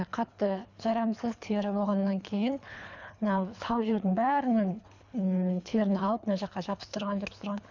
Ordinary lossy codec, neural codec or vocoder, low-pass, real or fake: none; codec, 24 kHz, 3 kbps, HILCodec; 7.2 kHz; fake